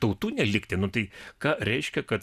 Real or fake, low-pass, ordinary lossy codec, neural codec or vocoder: real; 14.4 kHz; AAC, 96 kbps; none